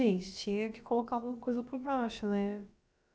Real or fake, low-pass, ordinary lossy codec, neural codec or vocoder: fake; none; none; codec, 16 kHz, about 1 kbps, DyCAST, with the encoder's durations